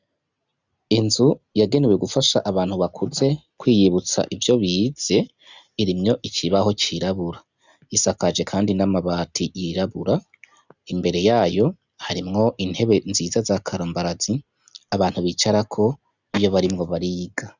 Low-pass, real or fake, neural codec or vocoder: 7.2 kHz; real; none